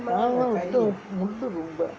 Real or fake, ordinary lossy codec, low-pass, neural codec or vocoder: real; none; none; none